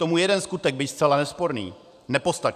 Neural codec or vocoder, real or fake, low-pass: vocoder, 44.1 kHz, 128 mel bands every 512 samples, BigVGAN v2; fake; 14.4 kHz